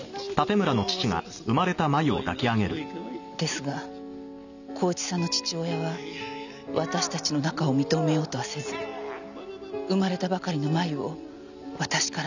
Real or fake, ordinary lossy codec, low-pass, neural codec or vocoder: real; none; 7.2 kHz; none